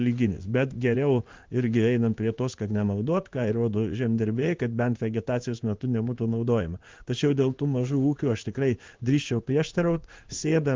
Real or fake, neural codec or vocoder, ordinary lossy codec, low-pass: fake; codec, 16 kHz in and 24 kHz out, 1 kbps, XY-Tokenizer; Opus, 32 kbps; 7.2 kHz